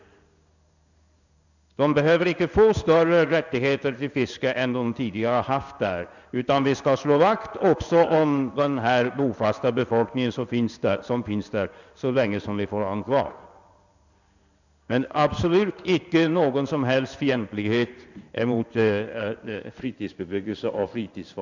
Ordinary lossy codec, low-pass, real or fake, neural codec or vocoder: none; 7.2 kHz; fake; codec, 16 kHz in and 24 kHz out, 1 kbps, XY-Tokenizer